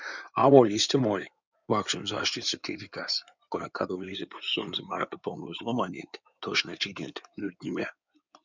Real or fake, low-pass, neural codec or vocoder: fake; 7.2 kHz; codec, 16 kHz in and 24 kHz out, 2.2 kbps, FireRedTTS-2 codec